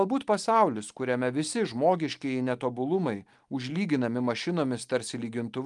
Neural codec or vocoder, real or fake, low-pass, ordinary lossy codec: none; real; 10.8 kHz; Opus, 32 kbps